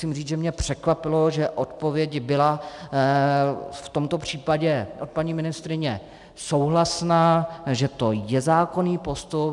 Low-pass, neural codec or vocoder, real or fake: 10.8 kHz; none; real